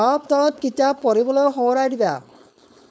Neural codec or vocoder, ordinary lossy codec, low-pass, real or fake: codec, 16 kHz, 4.8 kbps, FACodec; none; none; fake